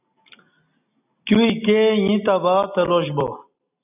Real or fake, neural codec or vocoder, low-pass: real; none; 3.6 kHz